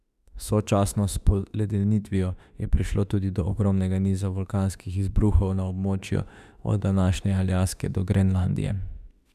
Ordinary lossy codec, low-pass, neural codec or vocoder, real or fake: none; 14.4 kHz; autoencoder, 48 kHz, 32 numbers a frame, DAC-VAE, trained on Japanese speech; fake